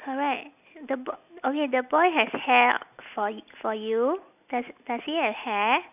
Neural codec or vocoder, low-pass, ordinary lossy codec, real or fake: none; 3.6 kHz; none; real